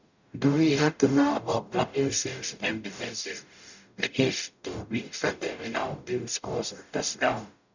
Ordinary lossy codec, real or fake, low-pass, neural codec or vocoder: none; fake; 7.2 kHz; codec, 44.1 kHz, 0.9 kbps, DAC